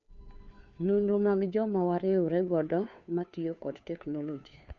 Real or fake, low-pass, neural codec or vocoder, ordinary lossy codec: fake; 7.2 kHz; codec, 16 kHz, 2 kbps, FunCodec, trained on Chinese and English, 25 frames a second; none